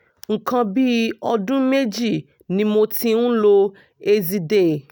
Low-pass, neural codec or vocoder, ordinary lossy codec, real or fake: none; none; none; real